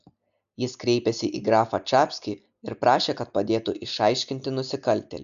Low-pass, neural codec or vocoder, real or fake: 7.2 kHz; none; real